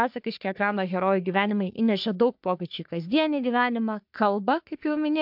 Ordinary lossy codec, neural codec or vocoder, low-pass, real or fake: MP3, 48 kbps; codec, 44.1 kHz, 3.4 kbps, Pupu-Codec; 5.4 kHz; fake